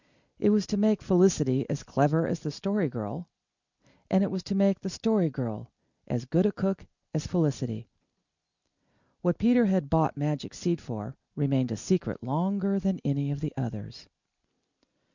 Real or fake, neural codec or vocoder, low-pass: real; none; 7.2 kHz